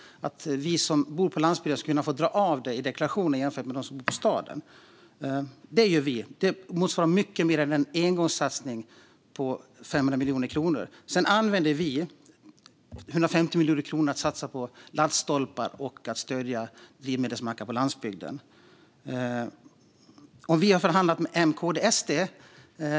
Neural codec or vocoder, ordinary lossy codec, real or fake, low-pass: none; none; real; none